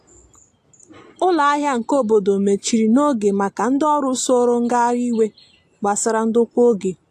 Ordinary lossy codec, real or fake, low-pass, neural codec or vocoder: AAC, 64 kbps; real; 14.4 kHz; none